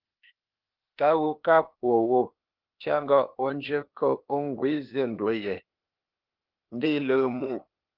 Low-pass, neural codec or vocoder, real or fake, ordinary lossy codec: 5.4 kHz; codec, 16 kHz, 0.8 kbps, ZipCodec; fake; Opus, 32 kbps